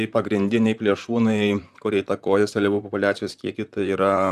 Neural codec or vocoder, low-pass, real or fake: vocoder, 44.1 kHz, 128 mel bands, Pupu-Vocoder; 14.4 kHz; fake